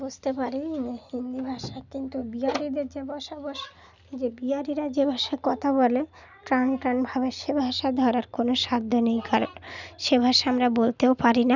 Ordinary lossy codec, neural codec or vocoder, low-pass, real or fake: none; none; 7.2 kHz; real